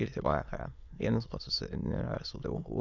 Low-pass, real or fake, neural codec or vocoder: 7.2 kHz; fake; autoencoder, 22.05 kHz, a latent of 192 numbers a frame, VITS, trained on many speakers